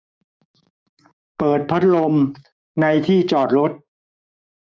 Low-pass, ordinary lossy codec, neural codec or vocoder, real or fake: none; none; codec, 16 kHz, 6 kbps, DAC; fake